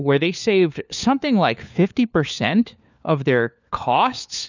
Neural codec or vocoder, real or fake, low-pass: codec, 16 kHz, 4 kbps, FunCodec, trained on LibriTTS, 50 frames a second; fake; 7.2 kHz